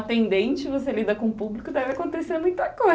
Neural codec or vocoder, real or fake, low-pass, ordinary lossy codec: none; real; none; none